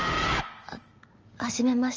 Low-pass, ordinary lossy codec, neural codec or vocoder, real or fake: 7.2 kHz; Opus, 24 kbps; none; real